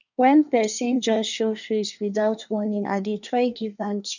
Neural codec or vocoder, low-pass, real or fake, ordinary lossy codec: codec, 24 kHz, 1 kbps, SNAC; 7.2 kHz; fake; none